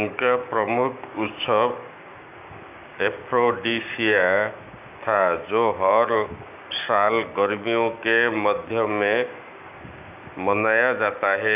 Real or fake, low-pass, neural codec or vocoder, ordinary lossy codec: fake; 3.6 kHz; codec, 16 kHz, 6 kbps, DAC; none